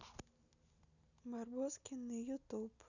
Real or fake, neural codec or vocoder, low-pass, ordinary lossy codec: real; none; 7.2 kHz; none